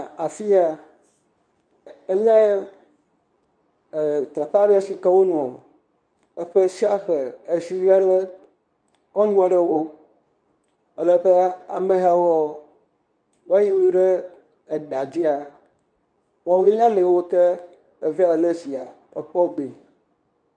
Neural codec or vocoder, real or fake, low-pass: codec, 24 kHz, 0.9 kbps, WavTokenizer, medium speech release version 2; fake; 9.9 kHz